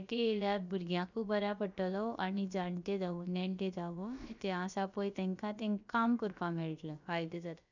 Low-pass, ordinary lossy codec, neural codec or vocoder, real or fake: 7.2 kHz; none; codec, 16 kHz, about 1 kbps, DyCAST, with the encoder's durations; fake